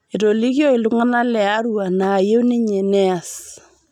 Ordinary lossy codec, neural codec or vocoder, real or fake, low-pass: none; none; real; 19.8 kHz